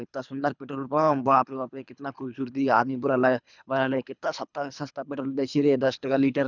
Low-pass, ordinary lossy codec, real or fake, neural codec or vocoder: 7.2 kHz; none; fake; codec, 24 kHz, 3 kbps, HILCodec